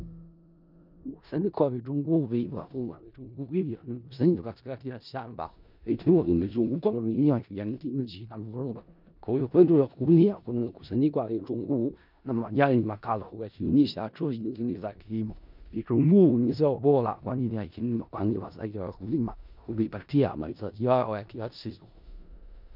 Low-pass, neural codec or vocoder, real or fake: 5.4 kHz; codec, 16 kHz in and 24 kHz out, 0.4 kbps, LongCat-Audio-Codec, four codebook decoder; fake